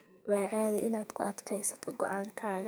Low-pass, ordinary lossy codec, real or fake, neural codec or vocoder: none; none; fake; codec, 44.1 kHz, 2.6 kbps, SNAC